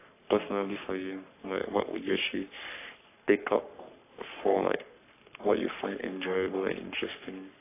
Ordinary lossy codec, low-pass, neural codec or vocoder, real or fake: AAC, 24 kbps; 3.6 kHz; codec, 44.1 kHz, 3.4 kbps, Pupu-Codec; fake